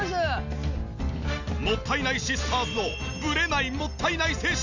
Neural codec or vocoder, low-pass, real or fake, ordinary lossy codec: none; 7.2 kHz; real; none